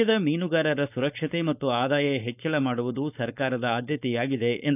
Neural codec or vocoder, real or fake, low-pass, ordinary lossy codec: codec, 16 kHz, 4.8 kbps, FACodec; fake; 3.6 kHz; none